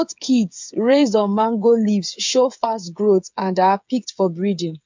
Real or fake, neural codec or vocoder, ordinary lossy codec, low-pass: fake; codec, 16 kHz, 8 kbps, FreqCodec, smaller model; MP3, 64 kbps; 7.2 kHz